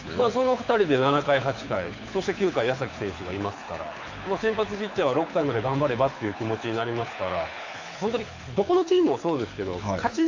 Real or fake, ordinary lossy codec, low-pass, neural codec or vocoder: fake; AAC, 48 kbps; 7.2 kHz; codec, 24 kHz, 6 kbps, HILCodec